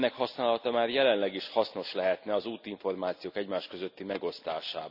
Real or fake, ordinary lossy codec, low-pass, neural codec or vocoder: real; none; 5.4 kHz; none